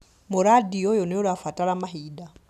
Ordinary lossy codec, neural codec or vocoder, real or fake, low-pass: none; none; real; 14.4 kHz